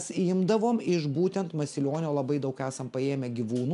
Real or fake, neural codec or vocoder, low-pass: real; none; 10.8 kHz